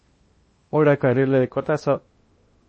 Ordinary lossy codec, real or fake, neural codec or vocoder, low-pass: MP3, 32 kbps; fake; codec, 16 kHz in and 24 kHz out, 0.8 kbps, FocalCodec, streaming, 65536 codes; 9.9 kHz